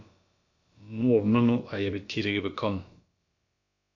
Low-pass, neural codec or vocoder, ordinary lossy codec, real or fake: 7.2 kHz; codec, 16 kHz, about 1 kbps, DyCAST, with the encoder's durations; AAC, 48 kbps; fake